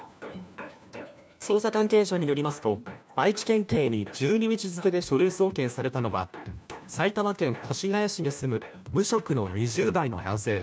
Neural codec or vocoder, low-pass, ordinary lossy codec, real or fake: codec, 16 kHz, 1 kbps, FunCodec, trained on LibriTTS, 50 frames a second; none; none; fake